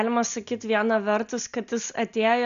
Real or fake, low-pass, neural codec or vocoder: real; 7.2 kHz; none